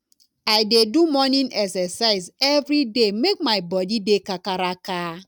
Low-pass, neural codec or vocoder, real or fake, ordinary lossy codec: 19.8 kHz; none; real; none